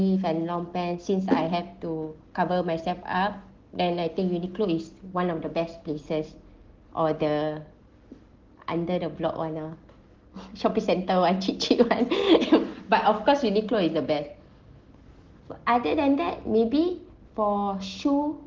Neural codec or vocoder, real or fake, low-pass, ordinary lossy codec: none; real; 7.2 kHz; Opus, 16 kbps